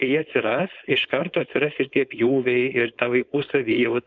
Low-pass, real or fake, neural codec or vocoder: 7.2 kHz; fake; codec, 16 kHz, 4.8 kbps, FACodec